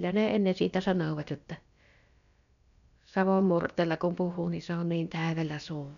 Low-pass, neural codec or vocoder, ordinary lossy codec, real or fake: 7.2 kHz; codec, 16 kHz, about 1 kbps, DyCAST, with the encoder's durations; none; fake